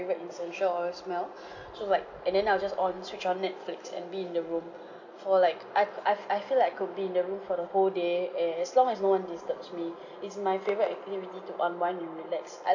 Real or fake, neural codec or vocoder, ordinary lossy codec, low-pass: real; none; none; 7.2 kHz